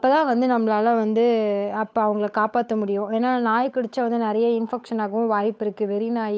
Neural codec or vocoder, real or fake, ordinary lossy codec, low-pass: codec, 16 kHz, 8 kbps, FunCodec, trained on Chinese and English, 25 frames a second; fake; none; none